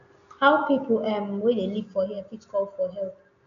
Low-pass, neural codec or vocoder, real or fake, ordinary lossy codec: 7.2 kHz; none; real; none